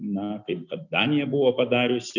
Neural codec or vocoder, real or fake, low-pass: none; real; 7.2 kHz